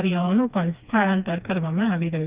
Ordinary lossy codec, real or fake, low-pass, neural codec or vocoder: Opus, 64 kbps; fake; 3.6 kHz; codec, 16 kHz, 2 kbps, FreqCodec, smaller model